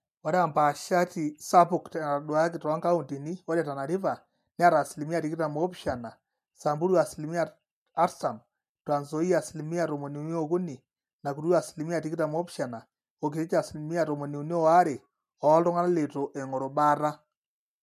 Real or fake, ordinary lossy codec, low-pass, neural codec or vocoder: real; MP3, 96 kbps; 14.4 kHz; none